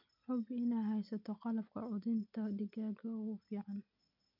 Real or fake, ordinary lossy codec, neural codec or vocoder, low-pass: real; none; none; 5.4 kHz